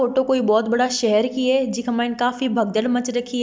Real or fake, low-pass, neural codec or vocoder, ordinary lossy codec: real; none; none; none